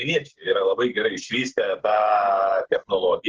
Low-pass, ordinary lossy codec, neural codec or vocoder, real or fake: 7.2 kHz; Opus, 16 kbps; codec, 16 kHz, 8 kbps, FreqCodec, smaller model; fake